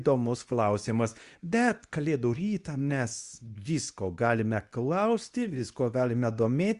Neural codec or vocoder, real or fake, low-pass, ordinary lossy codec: codec, 24 kHz, 0.9 kbps, WavTokenizer, medium speech release version 2; fake; 10.8 kHz; Opus, 64 kbps